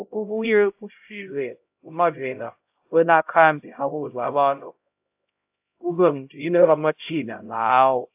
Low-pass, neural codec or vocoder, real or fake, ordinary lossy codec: 3.6 kHz; codec, 16 kHz, 0.5 kbps, X-Codec, HuBERT features, trained on LibriSpeech; fake; AAC, 32 kbps